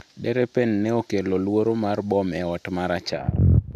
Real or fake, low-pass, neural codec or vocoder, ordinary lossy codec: real; 14.4 kHz; none; none